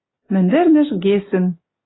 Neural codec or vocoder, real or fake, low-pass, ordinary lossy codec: none; real; 7.2 kHz; AAC, 16 kbps